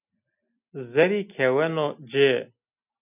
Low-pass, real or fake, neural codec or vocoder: 3.6 kHz; real; none